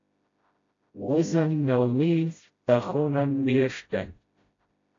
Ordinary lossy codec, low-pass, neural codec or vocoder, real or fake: AAC, 48 kbps; 7.2 kHz; codec, 16 kHz, 0.5 kbps, FreqCodec, smaller model; fake